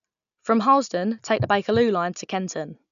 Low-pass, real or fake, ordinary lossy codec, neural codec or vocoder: 7.2 kHz; real; none; none